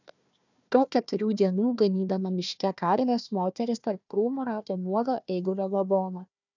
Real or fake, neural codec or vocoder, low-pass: fake; codec, 16 kHz, 1 kbps, FunCodec, trained on Chinese and English, 50 frames a second; 7.2 kHz